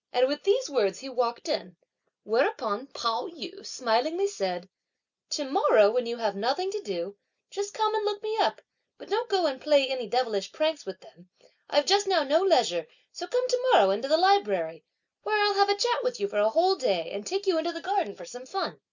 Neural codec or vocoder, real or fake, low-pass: none; real; 7.2 kHz